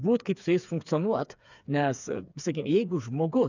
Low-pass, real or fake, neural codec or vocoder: 7.2 kHz; fake; codec, 16 kHz, 4 kbps, FreqCodec, smaller model